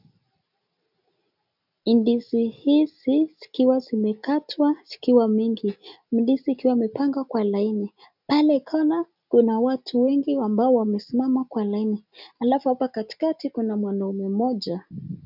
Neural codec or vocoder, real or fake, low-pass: none; real; 5.4 kHz